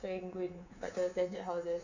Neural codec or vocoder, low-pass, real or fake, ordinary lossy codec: codec, 24 kHz, 3.1 kbps, DualCodec; 7.2 kHz; fake; none